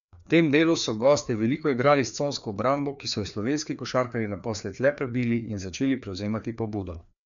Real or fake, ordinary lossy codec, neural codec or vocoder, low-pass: fake; none; codec, 16 kHz, 2 kbps, FreqCodec, larger model; 7.2 kHz